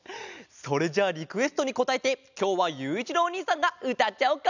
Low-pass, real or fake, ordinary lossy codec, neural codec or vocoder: 7.2 kHz; real; none; none